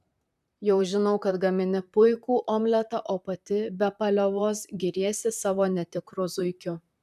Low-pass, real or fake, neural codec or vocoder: 14.4 kHz; fake; vocoder, 44.1 kHz, 128 mel bands, Pupu-Vocoder